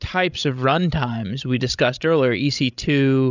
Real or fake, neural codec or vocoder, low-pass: fake; codec, 16 kHz, 16 kbps, FreqCodec, larger model; 7.2 kHz